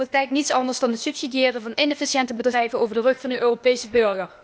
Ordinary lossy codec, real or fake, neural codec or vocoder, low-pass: none; fake; codec, 16 kHz, 0.8 kbps, ZipCodec; none